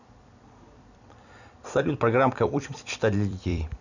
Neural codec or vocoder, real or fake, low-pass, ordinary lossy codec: vocoder, 44.1 kHz, 128 mel bands every 256 samples, BigVGAN v2; fake; 7.2 kHz; none